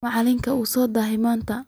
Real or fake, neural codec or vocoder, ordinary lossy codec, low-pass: real; none; none; none